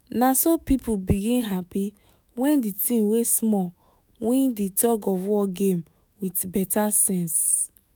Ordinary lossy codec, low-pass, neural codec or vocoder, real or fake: none; none; autoencoder, 48 kHz, 128 numbers a frame, DAC-VAE, trained on Japanese speech; fake